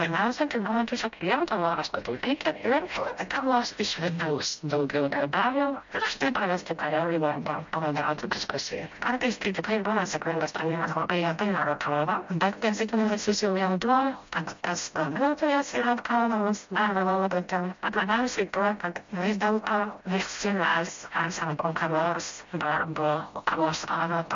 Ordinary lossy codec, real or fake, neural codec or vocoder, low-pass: MP3, 48 kbps; fake; codec, 16 kHz, 0.5 kbps, FreqCodec, smaller model; 7.2 kHz